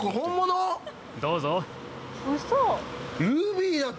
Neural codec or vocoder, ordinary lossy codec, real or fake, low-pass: none; none; real; none